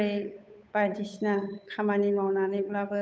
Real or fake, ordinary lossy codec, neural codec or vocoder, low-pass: fake; none; codec, 16 kHz, 8 kbps, FunCodec, trained on Chinese and English, 25 frames a second; none